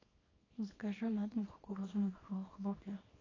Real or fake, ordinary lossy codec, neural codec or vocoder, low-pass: fake; AAC, 32 kbps; codec, 24 kHz, 0.9 kbps, WavTokenizer, small release; 7.2 kHz